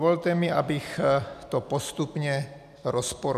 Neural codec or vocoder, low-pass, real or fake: none; 14.4 kHz; real